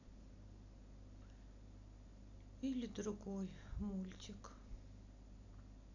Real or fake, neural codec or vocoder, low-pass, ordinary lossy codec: real; none; 7.2 kHz; none